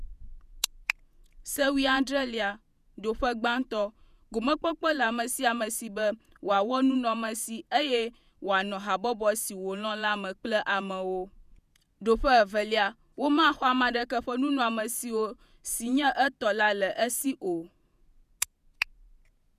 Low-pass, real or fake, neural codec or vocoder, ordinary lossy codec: 14.4 kHz; fake; vocoder, 48 kHz, 128 mel bands, Vocos; none